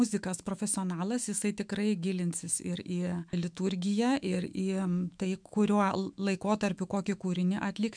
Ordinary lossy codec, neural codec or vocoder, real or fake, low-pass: MP3, 96 kbps; autoencoder, 48 kHz, 128 numbers a frame, DAC-VAE, trained on Japanese speech; fake; 9.9 kHz